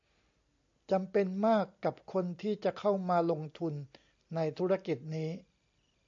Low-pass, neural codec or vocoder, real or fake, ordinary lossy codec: 7.2 kHz; none; real; AAC, 64 kbps